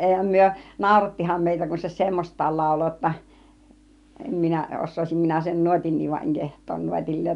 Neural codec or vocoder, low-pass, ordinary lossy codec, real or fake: none; 10.8 kHz; none; real